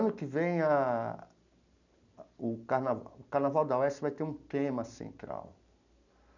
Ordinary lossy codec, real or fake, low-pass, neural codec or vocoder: none; real; 7.2 kHz; none